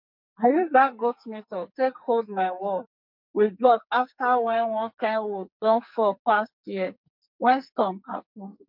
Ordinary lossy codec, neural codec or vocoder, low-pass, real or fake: MP3, 48 kbps; codec, 44.1 kHz, 2.6 kbps, SNAC; 5.4 kHz; fake